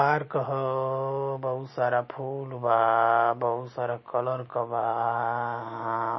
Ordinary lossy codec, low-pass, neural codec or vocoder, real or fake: MP3, 24 kbps; 7.2 kHz; none; real